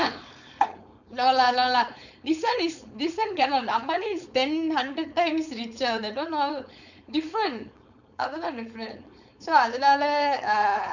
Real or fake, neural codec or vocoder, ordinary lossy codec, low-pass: fake; codec, 16 kHz, 4.8 kbps, FACodec; none; 7.2 kHz